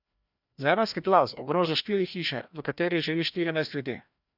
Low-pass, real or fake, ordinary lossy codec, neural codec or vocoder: 5.4 kHz; fake; none; codec, 16 kHz, 1 kbps, FreqCodec, larger model